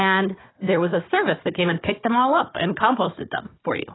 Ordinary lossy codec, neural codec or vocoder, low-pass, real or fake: AAC, 16 kbps; codec, 16 kHz, 4 kbps, FunCodec, trained on Chinese and English, 50 frames a second; 7.2 kHz; fake